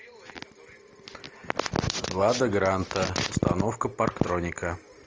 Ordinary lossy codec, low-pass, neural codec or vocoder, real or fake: Opus, 24 kbps; 7.2 kHz; none; real